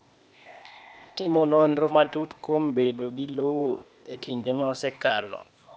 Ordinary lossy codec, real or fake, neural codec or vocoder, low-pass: none; fake; codec, 16 kHz, 0.8 kbps, ZipCodec; none